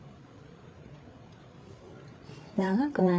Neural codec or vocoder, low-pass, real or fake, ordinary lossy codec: codec, 16 kHz, 8 kbps, FreqCodec, larger model; none; fake; none